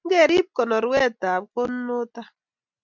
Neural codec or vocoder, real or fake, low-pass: none; real; 7.2 kHz